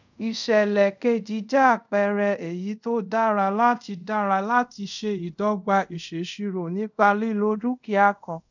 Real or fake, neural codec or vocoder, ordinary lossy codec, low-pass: fake; codec, 24 kHz, 0.5 kbps, DualCodec; none; 7.2 kHz